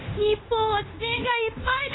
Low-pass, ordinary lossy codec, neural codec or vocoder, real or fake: 7.2 kHz; AAC, 16 kbps; codec, 16 kHz in and 24 kHz out, 0.9 kbps, LongCat-Audio-Codec, fine tuned four codebook decoder; fake